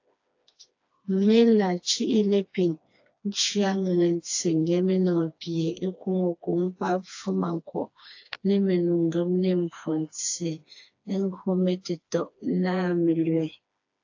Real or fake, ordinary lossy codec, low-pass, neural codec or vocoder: fake; AAC, 48 kbps; 7.2 kHz; codec, 16 kHz, 2 kbps, FreqCodec, smaller model